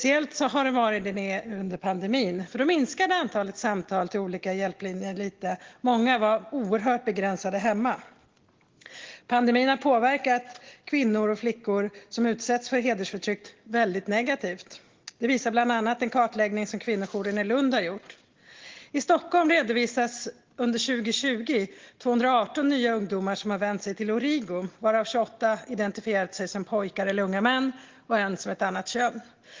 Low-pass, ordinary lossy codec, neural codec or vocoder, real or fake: 7.2 kHz; Opus, 16 kbps; none; real